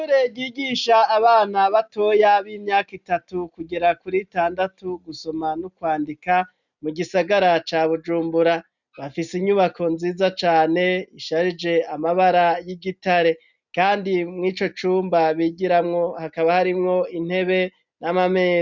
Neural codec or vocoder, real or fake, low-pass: none; real; 7.2 kHz